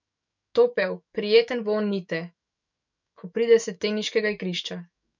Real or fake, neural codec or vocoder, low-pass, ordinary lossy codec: fake; autoencoder, 48 kHz, 128 numbers a frame, DAC-VAE, trained on Japanese speech; 7.2 kHz; none